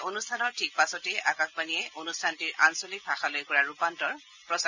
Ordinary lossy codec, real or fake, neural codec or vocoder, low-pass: none; real; none; 7.2 kHz